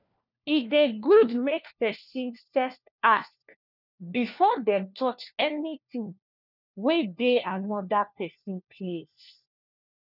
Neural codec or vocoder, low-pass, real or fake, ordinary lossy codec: codec, 16 kHz, 1 kbps, FunCodec, trained on LibriTTS, 50 frames a second; 5.4 kHz; fake; none